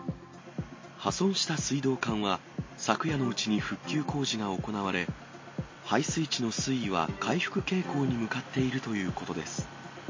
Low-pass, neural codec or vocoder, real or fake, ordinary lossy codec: 7.2 kHz; none; real; MP3, 32 kbps